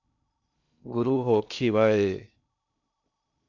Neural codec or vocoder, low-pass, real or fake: codec, 16 kHz in and 24 kHz out, 0.6 kbps, FocalCodec, streaming, 2048 codes; 7.2 kHz; fake